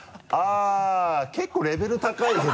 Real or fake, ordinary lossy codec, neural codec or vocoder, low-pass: real; none; none; none